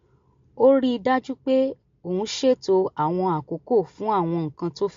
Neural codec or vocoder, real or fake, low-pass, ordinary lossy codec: none; real; 7.2 kHz; MP3, 48 kbps